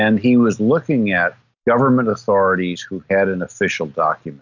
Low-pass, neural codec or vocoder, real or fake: 7.2 kHz; none; real